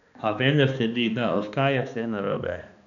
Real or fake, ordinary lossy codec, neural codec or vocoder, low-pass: fake; none; codec, 16 kHz, 2 kbps, X-Codec, HuBERT features, trained on balanced general audio; 7.2 kHz